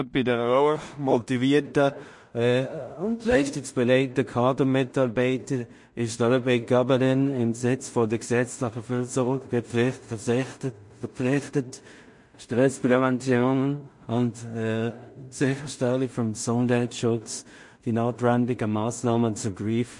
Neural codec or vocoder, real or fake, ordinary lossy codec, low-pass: codec, 16 kHz in and 24 kHz out, 0.4 kbps, LongCat-Audio-Codec, two codebook decoder; fake; MP3, 48 kbps; 10.8 kHz